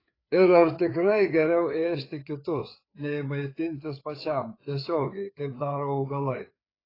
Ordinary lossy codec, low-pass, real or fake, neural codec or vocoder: AAC, 24 kbps; 5.4 kHz; fake; codec, 16 kHz, 4 kbps, FreqCodec, larger model